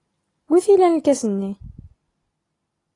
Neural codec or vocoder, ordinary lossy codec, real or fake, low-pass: none; AAC, 32 kbps; real; 10.8 kHz